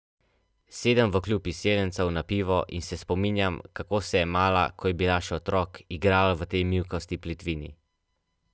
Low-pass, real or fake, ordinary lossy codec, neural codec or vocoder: none; real; none; none